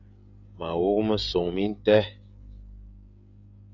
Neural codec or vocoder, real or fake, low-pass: codec, 16 kHz, 16 kbps, FreqCodec, smaller model; fake; 7.2 kHz